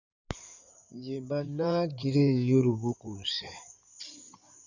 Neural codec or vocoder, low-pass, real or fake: codec, 16 kHz in and 24 kHz out, 2.2 kbps, FireRedTTS-2 codec; 7.2 kHz; fake